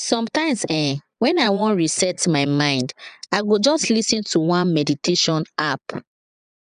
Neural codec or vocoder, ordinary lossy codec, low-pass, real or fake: vocoder, 22.05 kHz, 80 mel bands, Vocos; none; 9.9 kHz; fake